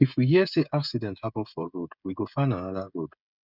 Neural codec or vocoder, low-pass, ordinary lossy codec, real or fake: codec, 16 kHz, 16 kbps, FreqCodec, smaller model; 5.4 kHz; none; fake